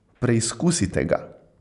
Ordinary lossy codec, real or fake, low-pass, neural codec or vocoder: none; real; 10.8 kHz; none